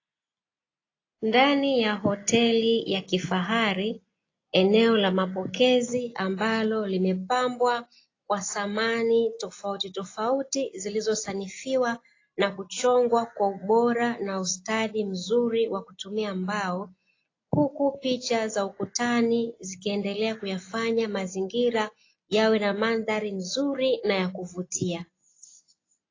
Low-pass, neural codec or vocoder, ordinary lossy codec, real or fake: 7.2 kHz; none; AAC, 32 kbps; real